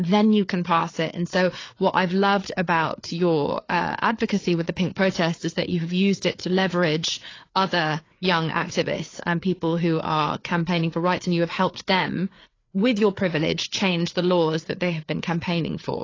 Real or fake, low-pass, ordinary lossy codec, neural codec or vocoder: fake; 7.2 kHz; AAC, 32 kbps; codec, 16 kHz, 4 kbps, FreqCodec, larger model